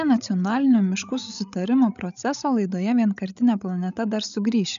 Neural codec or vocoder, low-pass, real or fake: codec, 16 kHz, 16 kbps, FreqCodec, larger model; 7.2 kHz; fake